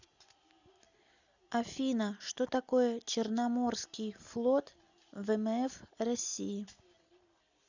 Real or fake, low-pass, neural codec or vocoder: real; 7.2 kHz; none